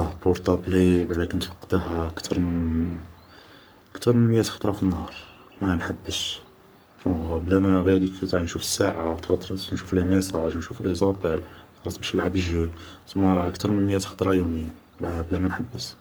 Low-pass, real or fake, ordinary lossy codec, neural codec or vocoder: none; fake; none; codec, 44.1 kHz, 3.4 kbps, Pupu-Codec